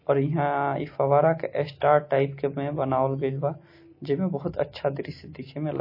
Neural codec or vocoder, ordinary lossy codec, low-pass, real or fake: none; MP3, 24 kbps; 5.4 kHz; real